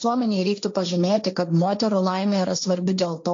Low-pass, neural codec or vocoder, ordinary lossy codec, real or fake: 7.2 kHz; codec, 16 kHz, 1.1 kbps, Voila-Tokenizer; AAC, 48 kbps; fake